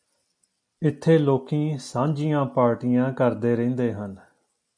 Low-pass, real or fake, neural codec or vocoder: 9.9 kHz; real; none